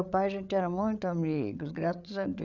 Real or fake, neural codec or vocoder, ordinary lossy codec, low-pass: fake; codec, 16 kHz, 8 kbps, FreqCodec, larger model; none; 7.2 kHz